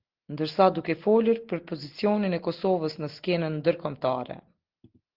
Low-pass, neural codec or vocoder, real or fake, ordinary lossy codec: 5.4 kHz; none; real; Opus, 16 kbps